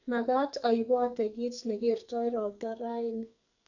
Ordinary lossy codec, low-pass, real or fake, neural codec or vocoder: none; 7.2 kHz; fake; codec, 44.1 kHz, 2.6 kbps, SNAC